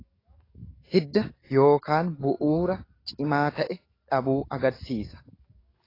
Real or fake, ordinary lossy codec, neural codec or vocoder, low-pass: fake; AAC, 24 kbps; codec, 44.1 kHz, 7.8 kbps, DAC; 5.4 kHz